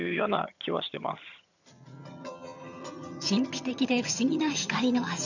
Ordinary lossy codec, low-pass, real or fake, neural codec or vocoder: none; 7.2 kHz; fake; vocoder, 22.05 kHz, 80 mel bands, HiFi-GAN